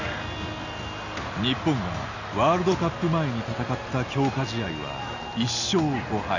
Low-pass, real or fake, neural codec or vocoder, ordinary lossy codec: 7.2 kHz; real; none; none